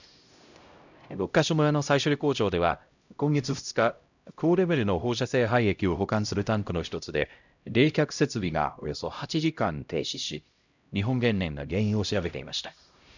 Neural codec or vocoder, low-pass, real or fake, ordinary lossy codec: codec, 16 kHz, 0.5 kbps, X-Codec, HuBERT features, trained on LibriSpeech; 7.2 kHz; fake; none